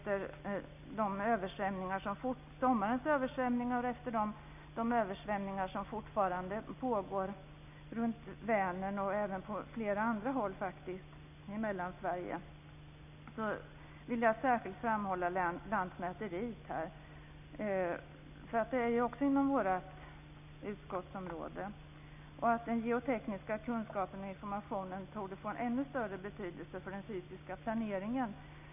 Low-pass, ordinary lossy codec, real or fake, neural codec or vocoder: 3.6 kHz; none; real; none